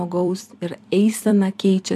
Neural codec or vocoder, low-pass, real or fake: vocoder, 44.1 kHz, 128 mel bands every 256 samples, BigVGAN v2; 14.4 kHz; fake